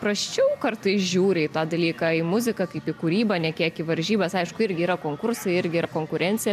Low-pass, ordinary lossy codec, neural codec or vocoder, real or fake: 14.4 kHz; MP3, 96 kbps; none; real